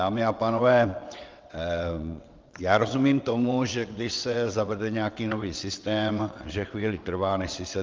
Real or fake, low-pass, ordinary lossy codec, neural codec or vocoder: fake; 7.2 kHz; Opus, 32 kbps; vocoder, 22.05 kHz, 80 mel bands, WaveNeXt